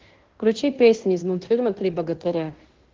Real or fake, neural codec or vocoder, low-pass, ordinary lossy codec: fake; codec, 16 kHz in and 24 kHz out, 0.9 kbps, LongCat-Audio-Codec, fine tuned four codebook decoder; 7.2 kHz; Opus, 16 kbps